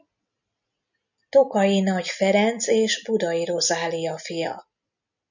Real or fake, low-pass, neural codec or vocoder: real; 7.2 kHz; none